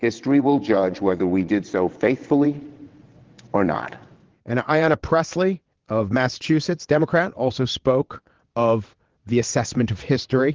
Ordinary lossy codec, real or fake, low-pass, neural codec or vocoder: Opus, 16 kbps; fake; 7.2 kHz; vocoder, 22.05 kHz, 80 mel bands, WaveNeXt